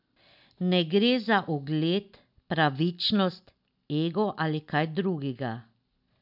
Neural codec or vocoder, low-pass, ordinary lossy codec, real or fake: none; 5.4 kHz; none; real